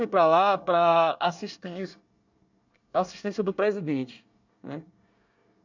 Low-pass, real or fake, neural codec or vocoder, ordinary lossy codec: 7.2 kHz; fake; codec, 24 kHz, 1 kbps, SNAC; none